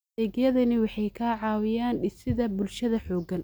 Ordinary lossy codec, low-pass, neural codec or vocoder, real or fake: none; none; none; real